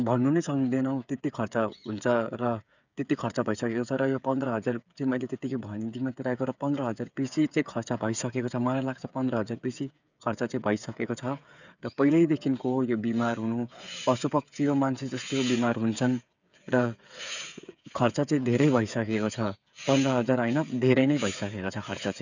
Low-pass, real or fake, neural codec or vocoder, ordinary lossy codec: 7.2 kHz; fake; codec, 16 kHz, 8 kbps, FreqCodec, smaller model; none